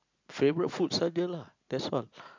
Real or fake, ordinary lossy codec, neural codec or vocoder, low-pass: real; MP3, 64 kbps; none; 7.2 kHz